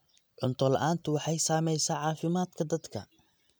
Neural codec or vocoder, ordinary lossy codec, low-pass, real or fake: none; none; none; real